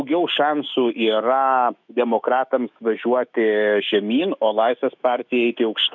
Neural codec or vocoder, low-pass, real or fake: autoencoder, 48 kHz, 128 numbers a frame, DAC-VAE, trained on Japanese speech; 7.2 kHz; fake